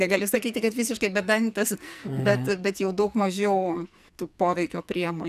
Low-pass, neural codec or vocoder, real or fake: 14.4 kHz; codec, 44.1 kHz, 2.6 kbps, SNAC; fake